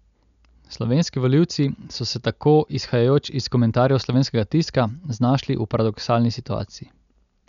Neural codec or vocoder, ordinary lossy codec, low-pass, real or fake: none; none; 7.2 kHz; real